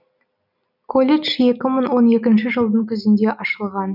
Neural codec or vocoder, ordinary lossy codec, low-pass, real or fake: none; none; 5.4 kHz; real